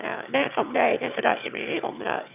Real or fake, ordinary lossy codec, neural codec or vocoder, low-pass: fake; none; autoencoder, 22.05 kHz, a latent of 192 numbers a frame, VITS, trained on one speaker; 3.6 kHz